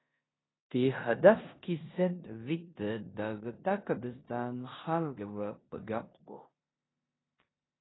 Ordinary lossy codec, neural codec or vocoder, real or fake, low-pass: AAC, 16 kbps; codec, 16 kHz in and 24 kHz out, 0.9 kbps, LongCat-Audio-Codec, four codebook decoder; fake; 7.2 kHz